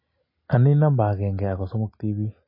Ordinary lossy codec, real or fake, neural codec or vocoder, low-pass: MP3, 24 kbps; real; none; 5.4 kHz